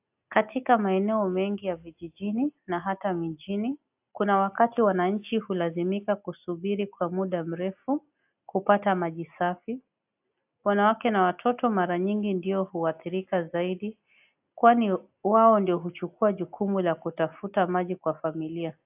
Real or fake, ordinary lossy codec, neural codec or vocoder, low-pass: real; AAC, 32 kbps; none; 3.6 kHz